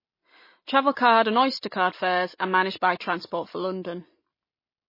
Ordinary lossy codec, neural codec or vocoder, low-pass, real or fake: MP3, 24 kbps; none; 5.4 kHz; real